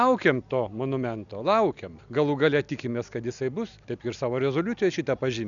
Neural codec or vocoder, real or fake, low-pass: none; real; 7.2 kHz